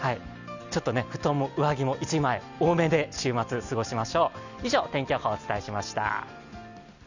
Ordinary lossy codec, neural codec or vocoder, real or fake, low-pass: none; none; real; 7.2 kHz